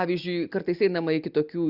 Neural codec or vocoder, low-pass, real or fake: none; 5.4 kHz; real